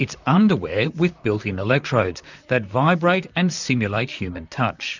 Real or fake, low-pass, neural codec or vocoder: fake; 7.2 kHz; vocoder, 44.1 kHz, 128 mel bands, Pupu-Vocoder